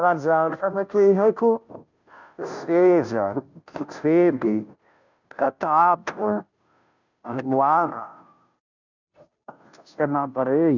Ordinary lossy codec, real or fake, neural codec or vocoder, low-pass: none; fake; codec, 16 kHz, 0.5 kbps, FunCodec, trained on Chinese and English, 25 frames a second; 7.2 kHz